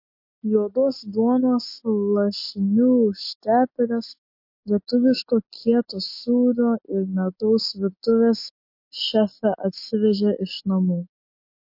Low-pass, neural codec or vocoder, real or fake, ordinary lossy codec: 5.4 kHz; none; real; MP3, 32 kbps